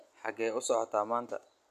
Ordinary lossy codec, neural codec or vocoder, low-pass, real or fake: none; none; 14.4 kHz; real